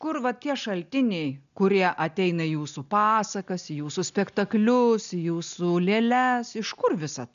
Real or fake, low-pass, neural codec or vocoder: real; 7.2 kHz; none